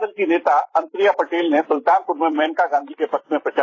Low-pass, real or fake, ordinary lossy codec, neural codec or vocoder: 7.2 kHz; fake; AAC, 32 kbps; vocoder, 44.1 kHz, 128 mel bands every 256 samples, BigVGAN v2